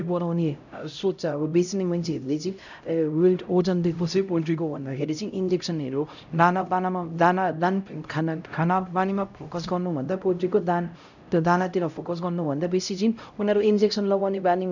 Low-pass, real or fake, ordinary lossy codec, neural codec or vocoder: 7.2 kHz; fake; none; codec, 16 kHz, 0.5 kbps, X-Codec, HuBERT features, trained on LibriSpeech